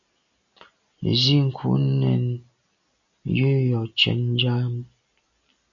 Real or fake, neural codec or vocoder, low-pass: real; none; 7.2 kHz